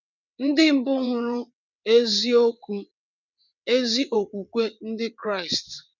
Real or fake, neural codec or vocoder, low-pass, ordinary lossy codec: fake; vocoder, 22.05 kHz, 80 mel bands, WaveNeXt; 7.2 kHz; none